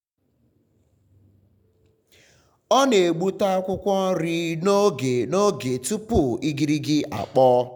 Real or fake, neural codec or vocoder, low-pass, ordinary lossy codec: real; none; 19.8 kHz; none